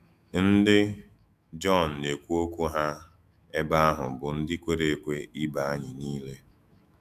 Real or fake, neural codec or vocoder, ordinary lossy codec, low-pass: fake; autoencoder, 48 kHz, 128 numbers a frame, DAC-VAE, trained on Japanese speech; none; 14.4 kHz